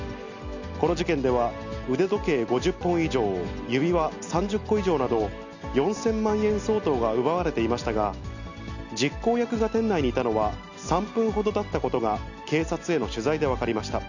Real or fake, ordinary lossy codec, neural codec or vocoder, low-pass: real; none; none; 7.2 kHz